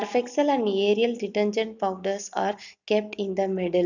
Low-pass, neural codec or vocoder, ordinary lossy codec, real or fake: 7.2 kHz; none; none; real